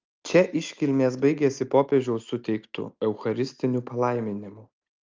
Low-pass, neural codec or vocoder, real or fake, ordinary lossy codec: 7.2 kHz; none; real; Opus, 32 kbps